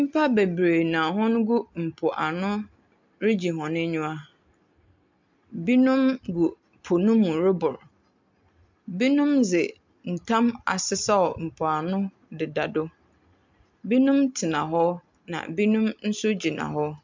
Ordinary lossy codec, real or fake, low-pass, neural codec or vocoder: MP3, 64 kbps; real; 7.2 kHz; none